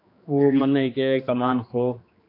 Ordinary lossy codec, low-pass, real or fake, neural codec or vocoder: AAC, 32 kbps; 5.4 kHz; fake; codec, 16 kHz, 2 kbps, X-Codec, HuBERT features, trained on general audio